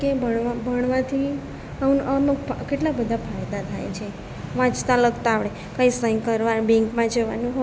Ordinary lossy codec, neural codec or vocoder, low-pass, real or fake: none; none; none; real